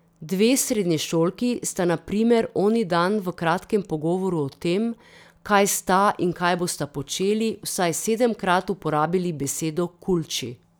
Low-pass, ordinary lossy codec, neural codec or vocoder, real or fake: none; none; none; real